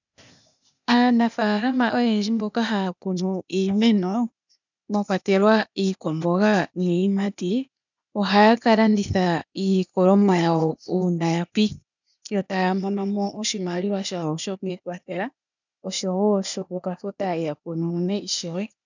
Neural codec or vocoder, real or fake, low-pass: codec, 16 kHz, 0.8 kbps, ZipCodec; fake; 7.2 kHz